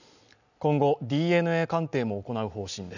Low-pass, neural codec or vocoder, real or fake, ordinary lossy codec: 7.2 kHz; none; real; none